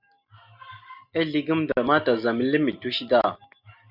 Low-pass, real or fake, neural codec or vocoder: 5.4 kHz; real; none